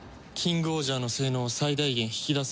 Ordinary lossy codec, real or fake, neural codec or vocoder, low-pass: none; real; none; none